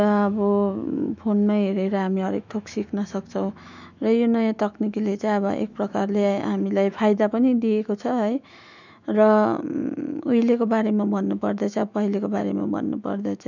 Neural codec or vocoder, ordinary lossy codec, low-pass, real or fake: autoencoder, 48 kHz, 128 numbers a frame, DAC-VAE, trained on Japanese speech; none; 7.2 kHz; fake